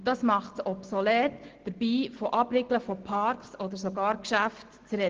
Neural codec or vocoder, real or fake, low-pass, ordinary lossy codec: none; real; 7.2 kHz; Opus, 16 kbps